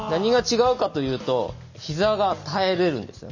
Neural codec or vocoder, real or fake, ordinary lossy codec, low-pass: none; real; none; 7.2 kHz